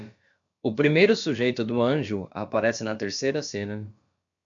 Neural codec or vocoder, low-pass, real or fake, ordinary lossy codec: codec, 16 kHz, about 1 kbps, DyCAST, with the encoder's durations; 7.2 kHz; fake; MP3, 64 kbps